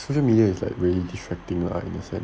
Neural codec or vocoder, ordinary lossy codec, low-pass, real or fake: none; none; none; real